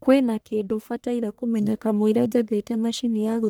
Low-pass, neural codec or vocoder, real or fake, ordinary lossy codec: none; codec, 44.1 kHz, 1.7 kbps, Pupu-Codec; fake; none